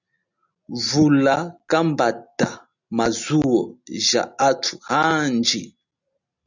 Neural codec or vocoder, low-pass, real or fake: none; 7.2 kHz; real